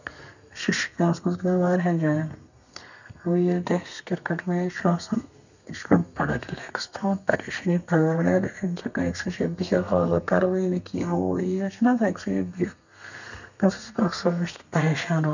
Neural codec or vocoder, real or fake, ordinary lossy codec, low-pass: codec, 32 kHz, 1.9 kbps, SNAC; fake; none; 7.2 kHz